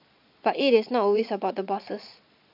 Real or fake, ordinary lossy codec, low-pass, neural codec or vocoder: fake; none; 5.4 kHz; vocoder, 22.05 kHz, 80 mel bands, Vocos